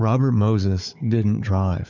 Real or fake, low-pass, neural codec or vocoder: fake; 7.2 kHz; codec, 16 kHz, 4 kbps, FunCodec, trained on Chinese and English, 50 frames a second